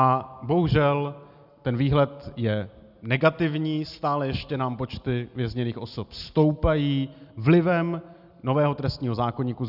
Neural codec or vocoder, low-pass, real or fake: none; 5.4 kHz; real